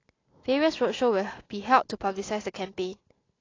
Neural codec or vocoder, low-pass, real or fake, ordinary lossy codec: none; 7.2 kHz; real; AAC, 32 kbps